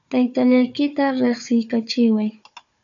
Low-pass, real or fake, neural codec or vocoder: 7.2 kHz; fake; codec, 16 kHz, 4 kbps, FunCodec, trained on Chinese and English, 50 frames a second